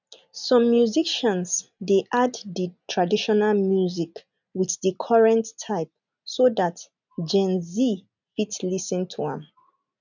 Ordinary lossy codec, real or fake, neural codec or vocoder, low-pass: none; real; none; 7.2 kHz